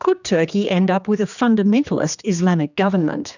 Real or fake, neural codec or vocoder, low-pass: fake; codec, 16 kHz, 2 kbps, X-Codec, HuBERT features, trained on general audio; 7.2 kHz